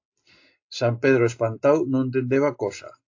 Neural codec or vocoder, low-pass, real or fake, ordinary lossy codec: none; 7.2 kHz; real; AAC, 48 kbps